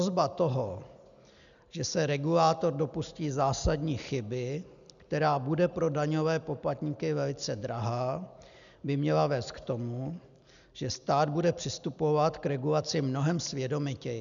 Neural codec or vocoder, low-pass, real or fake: none; 7.2 kHz; real